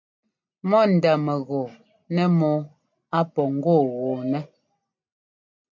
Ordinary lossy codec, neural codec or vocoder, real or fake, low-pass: MP3, 48 kbps; none; real; 7.2 kHz